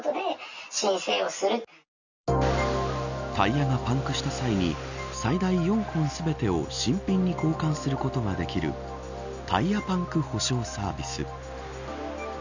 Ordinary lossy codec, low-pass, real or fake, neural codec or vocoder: none; 7.2 kHz; real; none